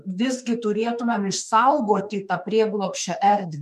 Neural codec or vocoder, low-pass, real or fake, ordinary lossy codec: autoencoder, 48 kHz, 32 numbers a frame, DAC-VAE, trained on Japanese speech; 14.4 kHz; fake; MP3, 64 kbps